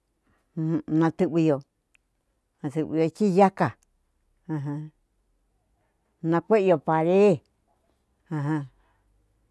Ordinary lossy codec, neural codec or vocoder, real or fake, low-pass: none; none; real; none